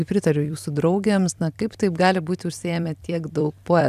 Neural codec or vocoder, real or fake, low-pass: vocoder, 44.1 kHz, 128 mel bands every 256 samples, BigVGAN v2; fake; 14.4 kHz